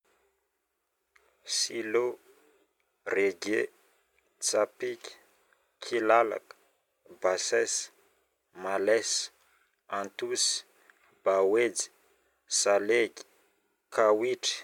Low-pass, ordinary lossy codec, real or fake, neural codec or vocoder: 19.8 kHz; none; real; none